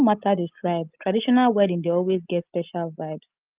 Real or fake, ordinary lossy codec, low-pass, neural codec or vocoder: real; Opus, 24 kbps; 3.6 kHz; none